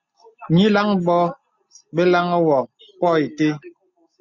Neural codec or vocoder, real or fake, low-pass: none; real; 7.2 kHz